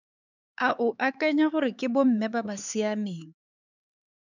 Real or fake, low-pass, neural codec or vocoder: fake; 7.2 kHz; codec, 16 kHz, 4 kbps, X-Codec, HuBERT features, trained on LibriSpeech